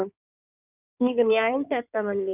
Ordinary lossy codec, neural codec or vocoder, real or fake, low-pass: none; codec, 16 kHz in and 24 kHz out, 1.1 kbps, FireRedTTS-2 codec; fake; 3.6 kHz